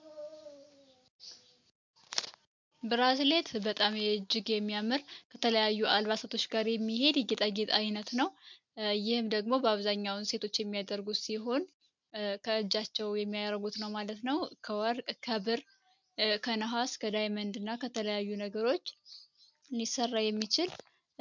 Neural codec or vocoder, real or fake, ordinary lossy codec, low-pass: none; real; MP3, 64 kbps; 7.2 kHz